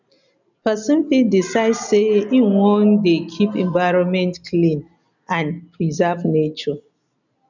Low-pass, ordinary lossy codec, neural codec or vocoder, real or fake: 7.2 kHz; none; none; real